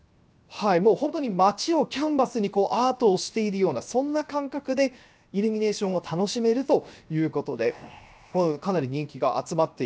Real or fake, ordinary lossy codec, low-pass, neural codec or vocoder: fake; none; none; codec, 16 kHz, 0.7 kbps, FocalCodec